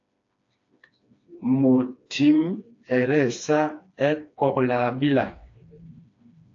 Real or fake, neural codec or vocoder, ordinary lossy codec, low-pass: fake; codec, 16 kHz, 2 kbps, FreqCodec, smaller model; AAC, 48 kbps; 7.2 kHz